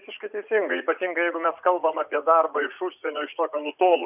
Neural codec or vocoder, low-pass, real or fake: vocoder, 44.1 kHz, 80 mel bands, Vocos; 3.6 kHz; fake